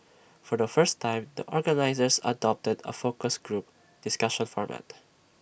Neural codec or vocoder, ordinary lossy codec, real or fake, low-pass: none; none; real; none